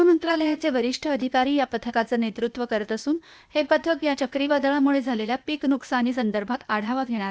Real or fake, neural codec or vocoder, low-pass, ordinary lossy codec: fake; codec, 16 kHz, 0.8 kbps, ZipCodec; none; none